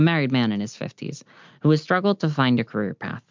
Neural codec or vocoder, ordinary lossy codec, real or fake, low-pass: none; MP3, 64 kbps; real; 7.2 kHz